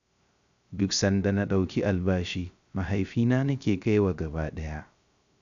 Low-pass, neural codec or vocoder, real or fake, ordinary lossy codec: 7.2 kHz; codec, 16 kHz, 0.3 kbps, FocalCodec; fake; none